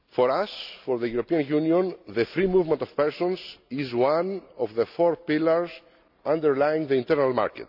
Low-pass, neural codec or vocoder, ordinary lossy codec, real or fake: 5.4 kHz; none; none; real